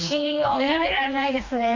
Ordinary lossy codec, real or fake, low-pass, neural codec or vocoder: AAC, 48 kbps; fake; 7.2 kHz; codec, 16 kHz, 2 kbps, FreqCodec, smaller model